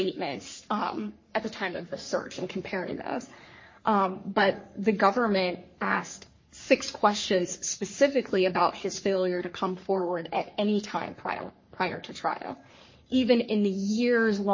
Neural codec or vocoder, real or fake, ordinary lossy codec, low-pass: codec, 44.1 kHz, 3.4 kbps, Pupu-Codec; fake; MP3, 32 kbps; 7.2 kHz